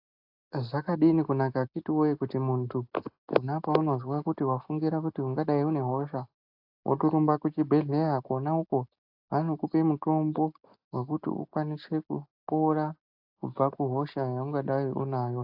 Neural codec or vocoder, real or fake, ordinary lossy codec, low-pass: none; real; AAC, 48 kbps; 5.4 kHz